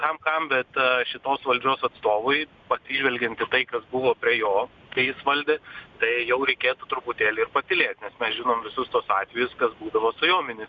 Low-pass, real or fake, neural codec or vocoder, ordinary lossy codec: 7.2 kHz; real; none; Opus, 64 kbps